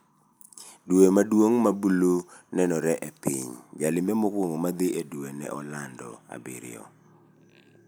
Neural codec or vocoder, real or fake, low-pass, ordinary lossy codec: none; real; none; none